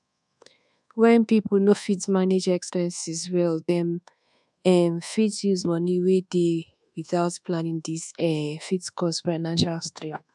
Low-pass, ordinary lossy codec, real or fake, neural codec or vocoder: 10.8 kHz; none; fake; codec, 24 kHz, 1.2 kbps, DualCodec